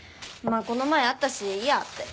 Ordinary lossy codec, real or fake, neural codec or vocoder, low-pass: none; real; none; none